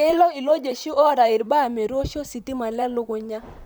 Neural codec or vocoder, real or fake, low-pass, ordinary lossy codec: vocoder, 44.1 kHz, 128 mel bands, Pupu-Vocoder; fake; none; none